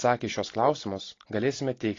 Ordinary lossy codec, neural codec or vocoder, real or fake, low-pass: AAC, 32 kbps; none; real; 7.2 kHz